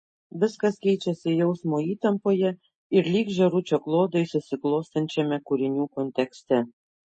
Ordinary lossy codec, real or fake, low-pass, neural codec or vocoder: MP3, 32 kbps; real; 10.8 kHz; none